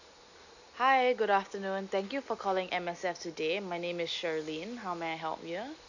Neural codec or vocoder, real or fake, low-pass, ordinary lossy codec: none; real; 7.2 kHz; none